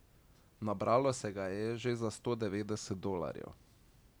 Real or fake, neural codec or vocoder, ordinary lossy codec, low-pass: real; none; none; none